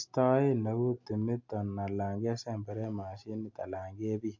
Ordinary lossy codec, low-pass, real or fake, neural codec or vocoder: MP3, 48 kbps; 7.2 kHz; real; none